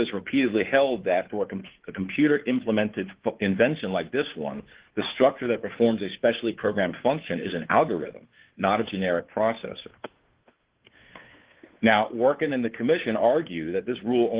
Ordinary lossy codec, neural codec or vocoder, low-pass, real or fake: Opus, 16 kbps; codec, 16 kHz, 2 kbps, FunCodec, trained on Chinese and English, 25 frames a second; 3.6 kHz; fake